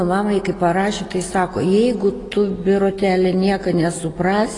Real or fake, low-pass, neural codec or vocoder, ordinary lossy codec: real; 10.8 kHz; none; AAC, 32 kbps